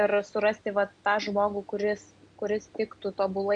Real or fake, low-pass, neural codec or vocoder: real; 9.9 kHz; none